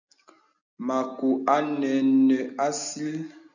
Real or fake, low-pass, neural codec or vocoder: real; 7.2 kHz; none